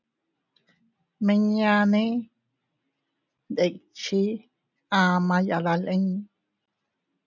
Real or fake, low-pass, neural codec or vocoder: real; 7.2 kHz; none